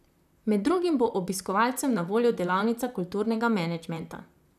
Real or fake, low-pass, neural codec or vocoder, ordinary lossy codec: fake; 14.4 kHz; vocoder, 44.1 kHz, 128 mel bands, Pupu-Vocoder; none